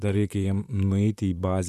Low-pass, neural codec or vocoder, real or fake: 14.4 kHz; vocoder, 44.1 kHz, 128 mel bands, Pupu-Vocoder; fake